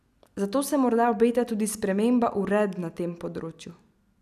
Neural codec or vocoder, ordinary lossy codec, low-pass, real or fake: none; none; 14.4 kHz; real